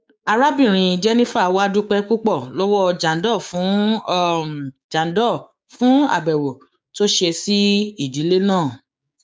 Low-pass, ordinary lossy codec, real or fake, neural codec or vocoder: none; none; fake; codec, 16 kHz, 6 kbps, DAC